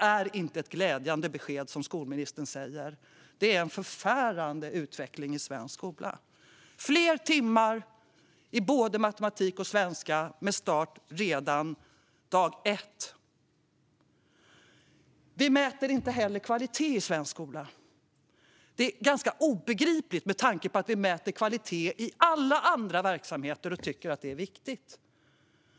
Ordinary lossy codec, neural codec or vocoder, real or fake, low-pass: none; none; real; none